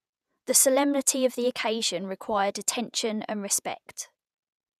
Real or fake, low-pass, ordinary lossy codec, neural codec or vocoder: fake; 14.4 kHz; none; vocoder, 48 kHz, 128 mel bands, Vocos